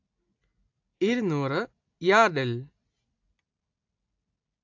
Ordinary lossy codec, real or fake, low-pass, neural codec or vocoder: AAC, 48 kbps; real; 7.2 kHz; none